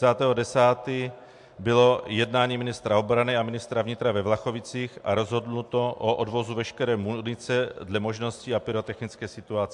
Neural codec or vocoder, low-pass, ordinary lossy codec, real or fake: none; 10.8 kHz; MP3, 64 kbps; real